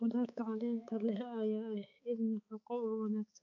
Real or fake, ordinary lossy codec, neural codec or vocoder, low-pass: fake; AAC, 48 kbps; codec, 16 kHz, 4 kbps, X-Codec, HuBERT features, trained on balanced general audio; 7.2 kHz